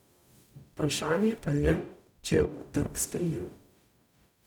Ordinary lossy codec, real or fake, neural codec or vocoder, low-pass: none; fake; codec, 44.1 kHz, 0.9 kbps, DAC; 19.8 kHz